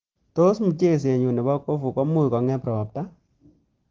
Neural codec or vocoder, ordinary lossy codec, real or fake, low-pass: none; Opus, 16 kbps; real; 7.2 kHz